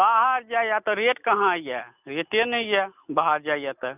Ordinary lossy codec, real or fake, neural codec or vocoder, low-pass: none; real; none; 3.6 kHz